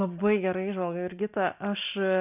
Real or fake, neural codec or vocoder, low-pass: real; none; 3.6 kHz